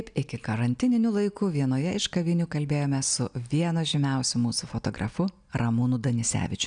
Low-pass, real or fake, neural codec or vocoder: 9.9 kHz; real; none